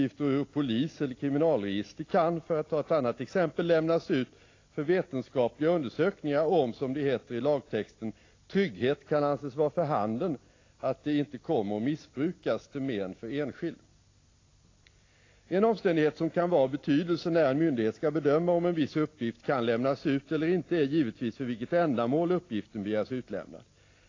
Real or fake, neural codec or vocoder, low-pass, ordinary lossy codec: real; none; 7.2 kHz; AAC, 32 kbps